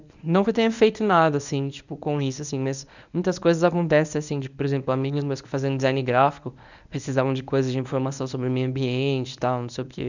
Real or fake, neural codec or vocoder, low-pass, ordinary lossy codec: fake; codec, 24 kHz, 0.9 kbps, WavTokenizer, medium speech release version 1; 7.2 kHz; none